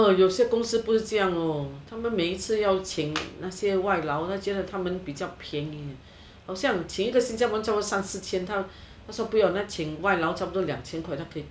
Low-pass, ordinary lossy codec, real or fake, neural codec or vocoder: none; none; real; none